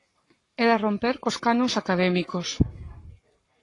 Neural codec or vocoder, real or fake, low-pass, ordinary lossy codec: codec, 44.1 kHz, 7.8 kbps, Pupu-Codec; fake; 10.8 kHz; AAC, 32 kbps